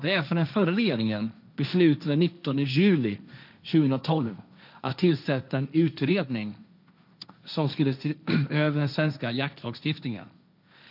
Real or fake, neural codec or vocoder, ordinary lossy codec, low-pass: fake; codec, 16 kHz, 1.1 kbps, Voila-Tokenizer; none; 5.4 kHz